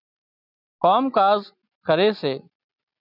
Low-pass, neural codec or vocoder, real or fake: 5.4 kHz; none; real